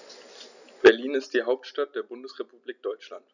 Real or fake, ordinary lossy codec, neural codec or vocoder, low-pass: real; none; none; 7.2 kHz